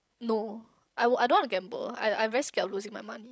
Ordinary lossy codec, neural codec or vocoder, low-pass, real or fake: none; codec, 16 kHz, 16 kbps, FreqCodec, larger model; none; fake